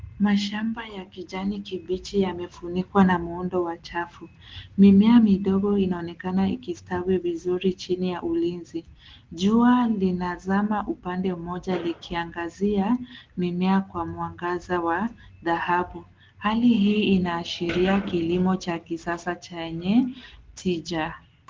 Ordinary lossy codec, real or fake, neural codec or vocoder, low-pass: Opus, 16 kbps; real; none; 7.2 kHz